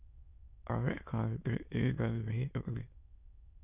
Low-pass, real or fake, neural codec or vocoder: 3.6 kHz; fake; autoencoder, 22.05 kHz, a latent of 192 numbers a frame, VITS, trained on many speakers